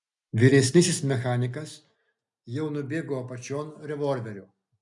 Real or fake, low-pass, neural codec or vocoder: real; 10.8 kHz; none